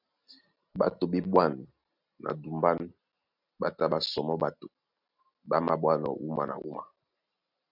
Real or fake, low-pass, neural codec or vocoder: real; 5.4 kHz; none